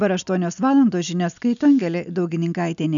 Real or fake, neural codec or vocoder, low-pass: real; none; 7.2 kHz